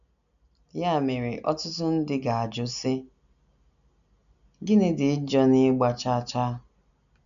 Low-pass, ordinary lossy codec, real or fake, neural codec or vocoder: 7.2 kHz; none; real; none